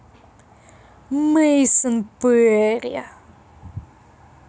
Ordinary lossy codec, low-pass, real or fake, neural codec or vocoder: none; none; real; none